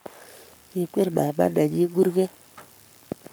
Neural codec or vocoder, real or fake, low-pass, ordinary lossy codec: codec, 44.1 kHz, 7.8 kbps, Pupu-Codec; fake; none; none